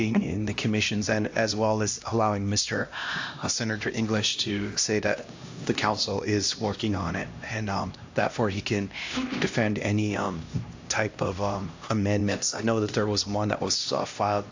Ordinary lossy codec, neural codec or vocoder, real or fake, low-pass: AAC, 48 kbps; codec, 16 kHz, 1 kbps, X-Codec, HuBERT features, trained on LibriSpeech; fake; 7.2 kHz